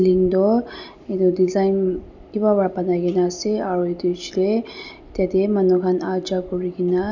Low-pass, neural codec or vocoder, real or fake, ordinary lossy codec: 7.2 kHz; none; real; none